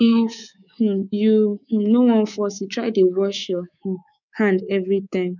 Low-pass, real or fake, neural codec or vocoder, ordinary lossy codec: 7.2 kHz; fake; codec, 24 kHz, 3.1 kbps, DualCodec; none